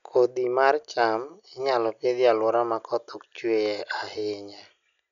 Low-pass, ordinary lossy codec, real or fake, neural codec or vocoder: 7.2 kHz; none; real; none